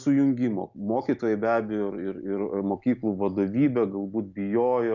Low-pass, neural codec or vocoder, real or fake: 7.2 kHz; none; real